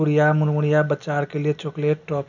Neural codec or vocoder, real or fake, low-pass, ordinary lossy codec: none; real; 7.2 kHz; none